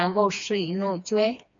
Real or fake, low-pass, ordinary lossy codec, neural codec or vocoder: fake; 7.2 kHz; MP3, 64 kbps; codec, 16 kHz, 2 kbps, FreqCodec, smaller model